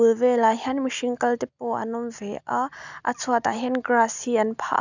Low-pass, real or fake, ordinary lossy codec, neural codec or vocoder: 7.2 kHz; real; none; none